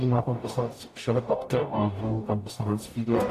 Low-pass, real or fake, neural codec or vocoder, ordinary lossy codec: 14.4 kHz; fake; codec, 44.1 kHz, 0.9 kbps, DAC; AAC, 64 kbps